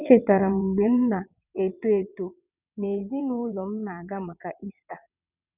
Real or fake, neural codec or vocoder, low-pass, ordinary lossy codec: fake; vocoder, 22.05 kHz, 80 mel bands, WaveNeXt; 3.6 kHz; none